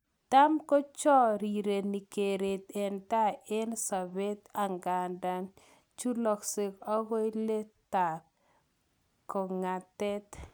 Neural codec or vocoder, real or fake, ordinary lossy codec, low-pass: none; real; none; none